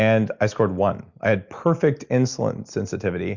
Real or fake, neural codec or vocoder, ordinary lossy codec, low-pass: real; none; Opus, 64 kbps; 7.2 kHz